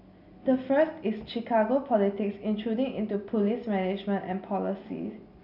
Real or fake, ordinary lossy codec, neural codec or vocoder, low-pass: real; none; none; 5.4 kHz